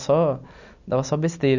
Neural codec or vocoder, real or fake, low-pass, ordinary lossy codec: none; real; 7.2 kHz; none